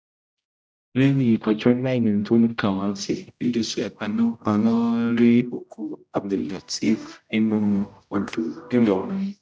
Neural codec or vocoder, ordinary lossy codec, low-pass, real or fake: codec, 16 kHz, 0.5 kbps, X-Codec, HuBERT features, trained on general audio; none; none; fake